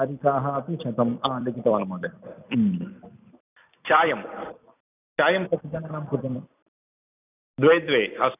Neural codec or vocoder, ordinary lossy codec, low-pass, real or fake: vocoder, 44.1 kHz, 128 mel bands every 256 samples, BigVGAN v2; none; 3.6 kHz; fake